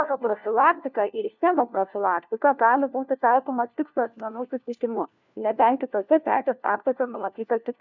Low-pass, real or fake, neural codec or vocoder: 7.2 kHz; fake; codec, 16 kHz, 1 kbps, FunCodec, trained on LibriTTS, 50 frames a second